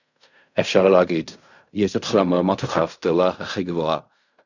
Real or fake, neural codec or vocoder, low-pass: fake; codec, 16 kHz in and 24 kHz out, 0.4 kbps, LongCat-Audio-Codec, fine tuned four codebook decoder; 7.2 kHz